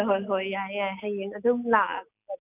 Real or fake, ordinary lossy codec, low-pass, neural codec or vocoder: real; none; 3.6 kHz; none